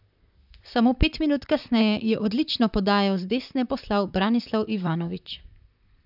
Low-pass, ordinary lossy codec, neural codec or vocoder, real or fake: 5.4 kHz; none; vocoder, 44.1 kHz, 128 mel bands, Pupu-Vocoder; fake